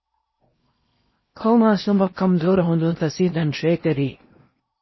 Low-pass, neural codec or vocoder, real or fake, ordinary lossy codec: 7.2 kHz; codec, 16 kHz in and 24 kHz out, 0.8 kbps, FocalCodec, streaming, 65536 codes; fake; MP3, 24 kbps